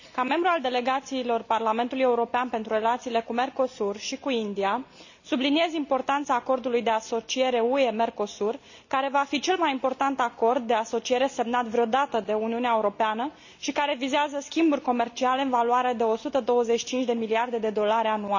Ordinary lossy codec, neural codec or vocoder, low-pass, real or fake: none; none; 7.2 kHz; real